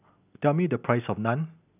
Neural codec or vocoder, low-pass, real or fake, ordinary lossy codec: none; 3.6 kHz; real; none